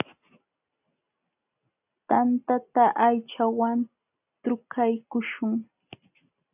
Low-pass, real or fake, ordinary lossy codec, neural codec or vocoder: 3.6 kHz; real; AAC, 32 kbps; none